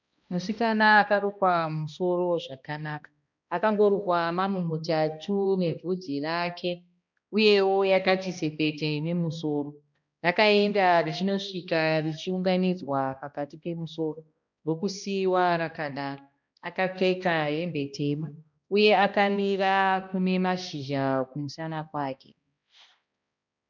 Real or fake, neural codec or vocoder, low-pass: fake; codec, 16 kHz, 1 kbps, X-Codec, HuBERT features, trained on balanced general audio; 7.2 kHz